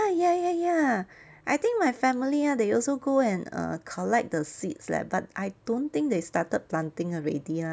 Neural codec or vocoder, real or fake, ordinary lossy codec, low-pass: none; real; none; none